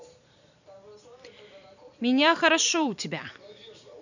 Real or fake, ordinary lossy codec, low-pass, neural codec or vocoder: fake; none; 7.2 kHz; vocoder, 44.1 kHz, 128 mel bands every 256 samples, BigVGAN v2